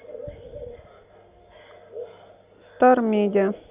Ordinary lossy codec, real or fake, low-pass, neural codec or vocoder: Opus, 64 kbps; real; 3.6 kHz; none